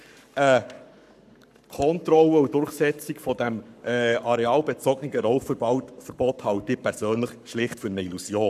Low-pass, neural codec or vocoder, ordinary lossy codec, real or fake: 14.4 kHz; codec, 44.1 kHz, 7.8 kbps, Pupu-Codec; none; fake